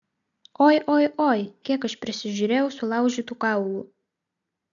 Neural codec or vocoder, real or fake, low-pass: none; real; 7.2 kHz